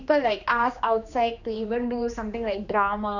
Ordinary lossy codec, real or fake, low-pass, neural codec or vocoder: none; fake; 7.2 kHz; codec, 16 kHz, 2 kbps, X-Codec, HuBERT features, trained on general audio